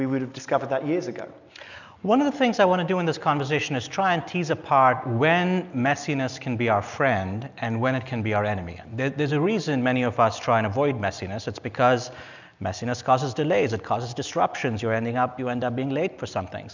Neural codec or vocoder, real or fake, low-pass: none; real; 7.2 kHz